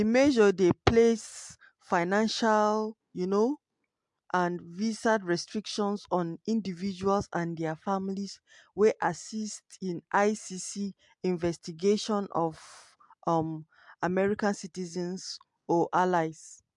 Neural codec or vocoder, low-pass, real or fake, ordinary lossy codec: none; 10.8 kHz; real; MP3, 64 kbps